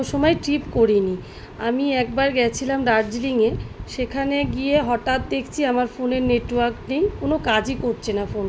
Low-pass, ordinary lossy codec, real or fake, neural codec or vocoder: none; none; real; none